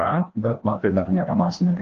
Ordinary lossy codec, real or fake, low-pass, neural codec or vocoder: Opus, 16 kbps; fake; 7.2 kHz; codec, 16 kHz, 1 kbps, FreqCodec, larger model